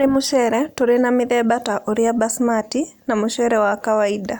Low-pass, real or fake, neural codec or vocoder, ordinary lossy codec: none; real; none; none